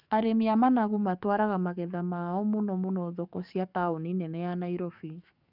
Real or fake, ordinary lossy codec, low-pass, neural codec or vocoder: fake; none; 5.4 kHz; codec, 44.1 kHz, 7.8 kbps, DAC